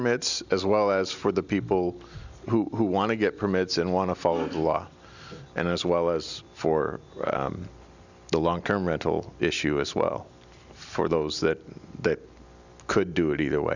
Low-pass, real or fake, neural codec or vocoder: 7.2 kHz; real; none